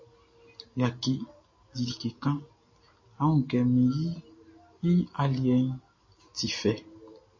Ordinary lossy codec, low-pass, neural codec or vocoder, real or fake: MP3, 32 kbps; 7.2 kHz; none; real